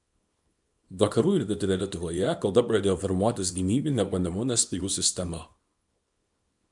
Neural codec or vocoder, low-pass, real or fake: codec, 24 kHz, 0.9 kbps, WavTokenizer, small release; 10.8 kHz; fake